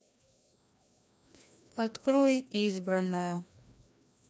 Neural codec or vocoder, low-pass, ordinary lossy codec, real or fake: codec, 16 kHz, 1 kbps, FreqCodec, larger model; none; none; fake